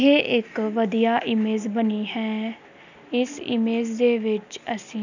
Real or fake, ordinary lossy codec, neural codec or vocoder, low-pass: real; none; none; 7.2 kHz